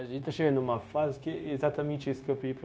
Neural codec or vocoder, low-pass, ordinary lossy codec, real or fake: codec, 16 kHz, 0.9 kbps, LongCat-Audio-Codec; none; none; fake